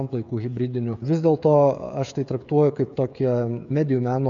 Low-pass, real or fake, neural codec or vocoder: 7.2 kHz; fake; codec, 16 kHz, 16 kbps, FreqCodec, smaller model